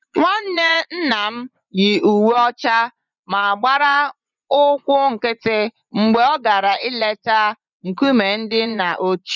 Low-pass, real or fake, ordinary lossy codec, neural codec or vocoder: 7.2 kHz; fake; none; vocoder, 24 kHz, 100 mel bands, Vocos